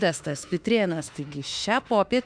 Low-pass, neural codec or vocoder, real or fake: 9.9 kHz; autoencoder, 48 kHz, 32 numbers a frame, DAC-VAE, trained on Japanese speech; fake